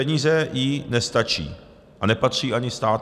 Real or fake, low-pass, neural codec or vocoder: real; 14.4 kHz; none